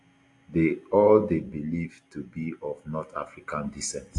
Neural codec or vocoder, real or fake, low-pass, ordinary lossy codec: none; real; 10.8 kHz; AAC, 48 kbps